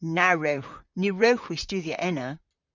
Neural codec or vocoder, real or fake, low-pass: vocoder, 44.1 kHz, 128 mel bands, Pupu-Vocoder; fake; 7.2 kHz